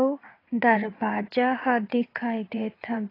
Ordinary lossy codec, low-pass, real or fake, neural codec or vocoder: AAC, 24 kbps; 5.4 kHz; fake; vocoder, 22.05 kHz, 80 mel bands, WaveNeXt